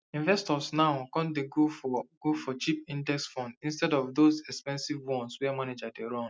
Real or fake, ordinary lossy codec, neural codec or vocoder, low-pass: real; none; none; none